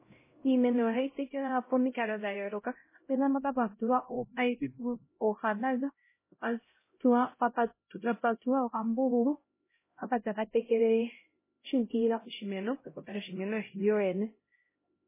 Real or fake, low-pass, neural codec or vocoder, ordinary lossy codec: fake; 3.6 kHz; codec, 16 kHz, 0.5 kbps, X-Codec, HuBERT features, trained on LibriSpeech; MP3, 16 kbps